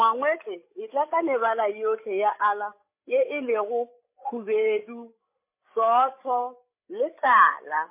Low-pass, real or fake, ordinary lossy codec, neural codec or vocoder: 3.6 kHz; fake; MP3, 24 kbps; codec, 16 kHz, 8 kbps, FreqCodec, larger model